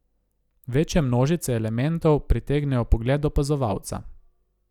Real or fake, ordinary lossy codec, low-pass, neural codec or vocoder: real; none; 19.8 kHz; none